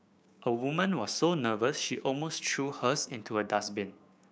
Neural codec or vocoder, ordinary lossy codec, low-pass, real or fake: codec, 16 kHz, 6 kbps, DAC; none; none; fake